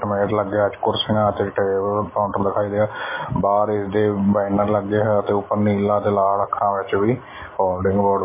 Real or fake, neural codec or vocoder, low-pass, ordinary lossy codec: real; none; 3.6 kHz; MP3, 16 kbps